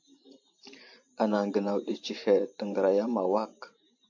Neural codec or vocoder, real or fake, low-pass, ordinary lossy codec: vocoder, 44.1 kHz, 128 mel bands every 512 samples, BigVGAN v2; fake; 7.2 kHz; AAC, 48 kbps